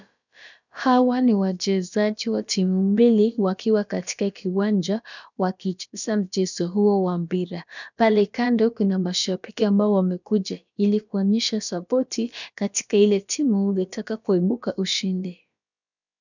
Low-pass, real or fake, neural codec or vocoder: 7.2 kHz; fake; codec, 16 kHz, about 1 kbps, DyCAST, with the encoder's durations